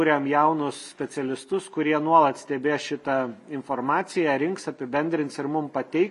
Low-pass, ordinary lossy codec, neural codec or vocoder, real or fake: 14.4 kHz; MP3, 48 kbps; none; real